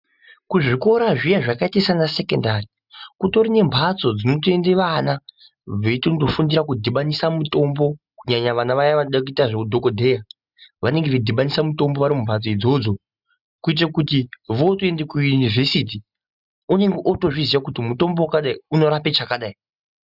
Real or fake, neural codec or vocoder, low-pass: real; none; 5.4 kHz